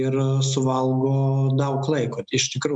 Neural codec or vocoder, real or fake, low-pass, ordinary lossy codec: none; real; 9.9 kHz; MP3, 96 kbps